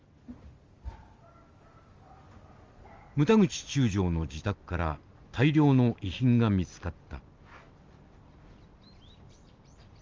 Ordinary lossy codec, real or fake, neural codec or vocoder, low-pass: Opus, 32 kbps; real; none; 7.2 kHz